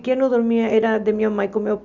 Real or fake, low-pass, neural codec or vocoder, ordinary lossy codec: real; 7.2 kHz; none; none